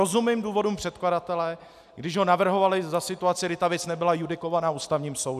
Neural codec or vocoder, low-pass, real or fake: none; 14.4 kHz; real